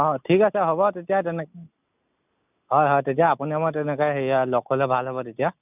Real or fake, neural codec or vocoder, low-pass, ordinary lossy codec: real; none; 3.6 kHz; none